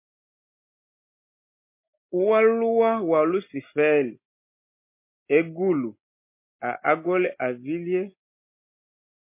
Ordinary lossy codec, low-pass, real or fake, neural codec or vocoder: MP3, 24 kbps; 3.6 kHz; real; none